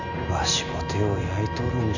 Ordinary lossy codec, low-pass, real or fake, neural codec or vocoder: none; 7.2 kHz; real; none